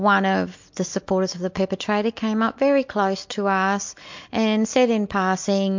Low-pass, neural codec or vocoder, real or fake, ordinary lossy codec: 7.2 kHz; none; real; MP3, 48 kbps